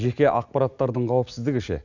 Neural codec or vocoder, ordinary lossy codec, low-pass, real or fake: none; Opus, 64 kbps; 7.2 kHz; real